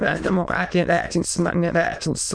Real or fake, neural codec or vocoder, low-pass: fake; autoencoder, 22.05 kHz, a latent of 192 numbers a frame, VITS, trained on many speakers; 9.9 kHz